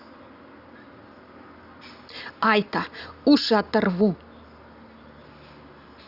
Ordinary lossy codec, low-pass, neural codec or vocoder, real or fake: Opus, 64 kbps; 5.4 kHz; none; real